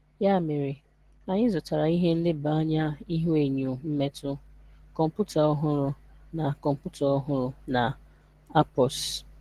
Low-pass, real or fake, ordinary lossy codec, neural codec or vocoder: 14.4 kHz; real; Opus, 16 kbps; none